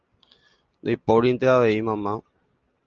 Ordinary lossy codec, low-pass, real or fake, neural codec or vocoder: Opus, 24 kbps; 7.2 kHz; real; none